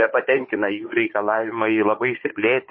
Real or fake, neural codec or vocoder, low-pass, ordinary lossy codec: fake; codec, 16 kHz, 16 kbps, FunCodec, trained on Chinese and English, 50 frames a second; 7.2 kHz; MP3, 24 kbps